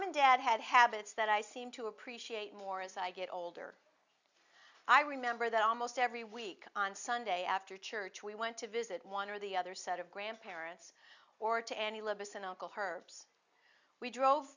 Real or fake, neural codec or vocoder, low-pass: real; none; 7.2 kHz